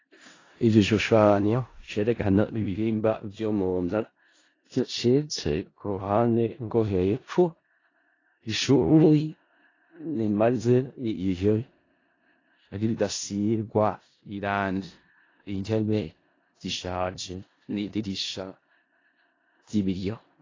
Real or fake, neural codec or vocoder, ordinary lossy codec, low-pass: fake; codec, 16 kHz in and 24 kHz out, 0.4 kbps, LongCat-Audio-Codec, four codebook decoder; AAC, 32 kbps; 7.2 kHz